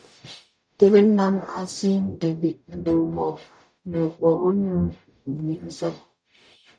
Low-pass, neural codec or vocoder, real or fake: 9.9 kHz; codec, 44.1 kHz, 0.9 kbps, DAC; fake